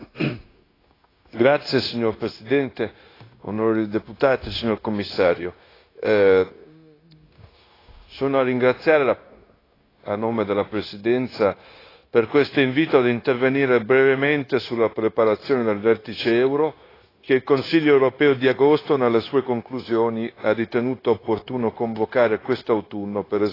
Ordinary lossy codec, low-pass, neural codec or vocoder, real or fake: AAC, 24 kbps; 5.4 kHz; codec, 16 kHz, 0.9 kbps, LongCat-Audio-Codec; fake